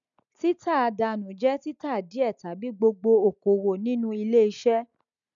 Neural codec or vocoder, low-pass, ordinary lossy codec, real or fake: none; 7.2 kHz; none; real